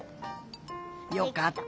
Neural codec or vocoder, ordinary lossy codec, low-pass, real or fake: none; none; none; real